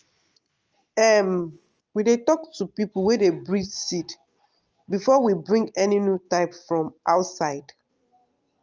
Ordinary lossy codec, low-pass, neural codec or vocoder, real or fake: Opus, 24 kbps; 7.2 kHz; none; real